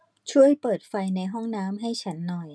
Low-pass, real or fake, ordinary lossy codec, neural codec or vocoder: none; real; none; none